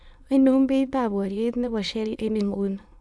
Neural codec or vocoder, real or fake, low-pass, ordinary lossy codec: autoencoder, 22.05 kHz, a latent of 192 numbers a frame, VITS, trained on many speakers; fake; none; none